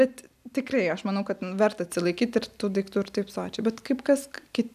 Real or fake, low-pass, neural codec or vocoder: real; 14.4 kHz; none